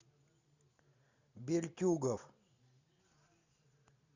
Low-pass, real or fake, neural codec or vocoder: 7.2 kHz; real; none